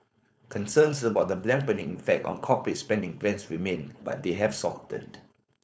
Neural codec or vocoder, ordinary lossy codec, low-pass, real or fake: codec, 16 kHz, 4.8 kbps, FACodec; none; none; fake